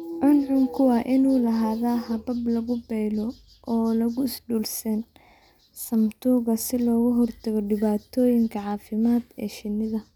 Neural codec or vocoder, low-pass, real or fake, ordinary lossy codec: none; 19.8 kHz; real; none